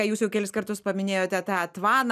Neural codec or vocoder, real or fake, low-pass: none; real; 14.4 kHz